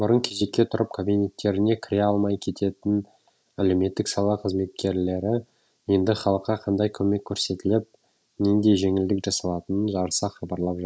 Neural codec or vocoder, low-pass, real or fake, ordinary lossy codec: none; none; real; none